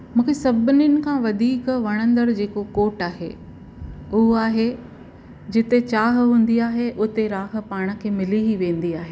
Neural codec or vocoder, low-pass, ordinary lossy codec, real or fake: none; none; none; real